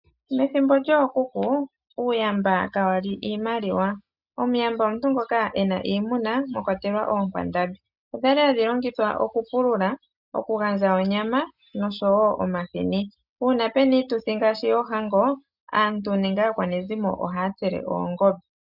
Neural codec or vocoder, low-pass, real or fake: none; 5.4 kHz; real